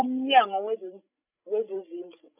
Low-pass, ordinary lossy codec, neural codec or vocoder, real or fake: 3.6 kHz; none; codec, 16 kHz, 16 kbps, FreqCodec, larger model; fake